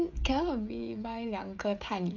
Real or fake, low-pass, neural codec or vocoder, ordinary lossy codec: fake; 7.2 kHz; codec, 16 kHz, 16 kbps, FreqCodec, smaller model; none